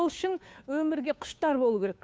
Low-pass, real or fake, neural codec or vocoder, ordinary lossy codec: none; fake; codec, 16 kHz, 2 kbps, FunCodec, trained on Chinese and English, 25 frames a second; none